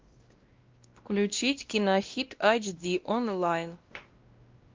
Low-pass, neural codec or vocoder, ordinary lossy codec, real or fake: 7.2 kHz; codec, 16 kHz, 1 kbps, X-Codec, WavLM features, trained on Multilingual LibriSpeech; Opus, 32 kbps; fake